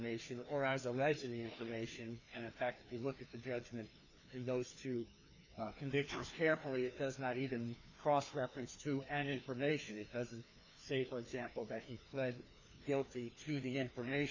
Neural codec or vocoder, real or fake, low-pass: codec, 16 kHz, 2 kbps, FreqCodec, larger model; fake; 7.2 kHz